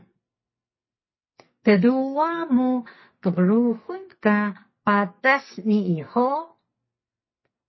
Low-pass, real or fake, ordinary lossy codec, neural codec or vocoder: 7.2 kHz; fake; MP3, 24 kbps; codec, 44.1 kHz, 2.6 kbps, SNAC